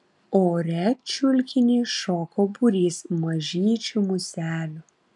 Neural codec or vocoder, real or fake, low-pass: none; real; 10.8 kHz